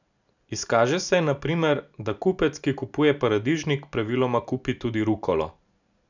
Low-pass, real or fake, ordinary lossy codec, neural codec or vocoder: 7.2 kHz; real; none; none